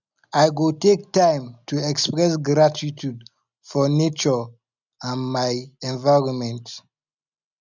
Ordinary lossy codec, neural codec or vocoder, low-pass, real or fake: none; none; 7.2 kHz; real